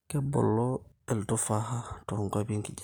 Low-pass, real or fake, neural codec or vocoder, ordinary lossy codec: none; real; none; none